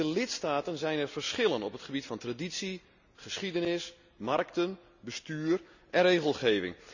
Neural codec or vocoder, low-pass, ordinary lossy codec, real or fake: none; 7.2 kHz; none; real